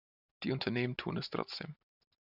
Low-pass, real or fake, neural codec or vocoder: 5.4 kHz; real; none